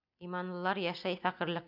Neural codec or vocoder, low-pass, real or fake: none; 5.4 kHz; real